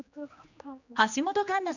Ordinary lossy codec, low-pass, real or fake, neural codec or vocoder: none; 7.2 kHz; fake; codec, 16 kHz, 2 kbps, X-Codec, HuBERT features, trained on general audio